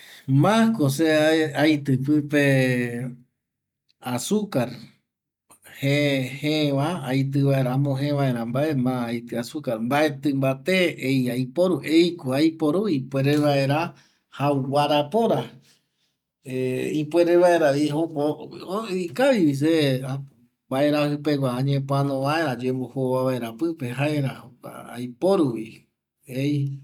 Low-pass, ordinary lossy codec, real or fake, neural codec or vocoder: 19.8 kHz; none; real; none